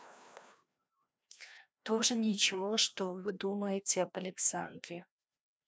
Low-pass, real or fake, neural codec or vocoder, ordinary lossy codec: none; fake; codec, 16 kHz, 1 kbps, FreqCodec, larger model; none